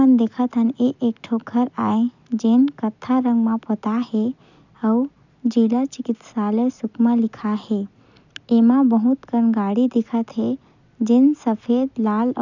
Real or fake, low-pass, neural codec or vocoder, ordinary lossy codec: real; 7.2 kHz; none; none